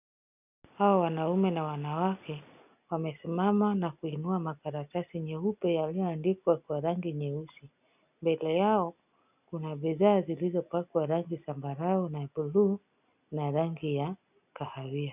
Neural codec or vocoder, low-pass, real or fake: none; 3.6 kHz; real